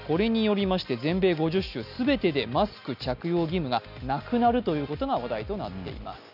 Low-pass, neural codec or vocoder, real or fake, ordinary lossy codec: 5.4 kHz; none; real; none